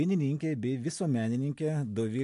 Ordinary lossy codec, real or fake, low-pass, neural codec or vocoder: AAC, 64 kbps; real; 10.8 kHz; none